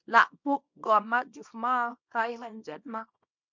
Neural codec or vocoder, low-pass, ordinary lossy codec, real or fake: codec, 24 kHz, 0.9 kbps, WavTokenizer, small release; 7.2 kHz; MP3, 64 kbps; fake